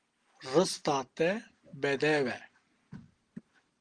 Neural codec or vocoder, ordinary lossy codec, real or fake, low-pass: none; Opus, 24 kbps; real; 9.9 kHz